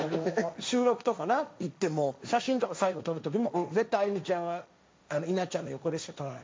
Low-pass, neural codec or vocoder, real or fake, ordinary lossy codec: none; codec, 16 kHz, 1.1 kbps, Voila-Tokenizer; fake; none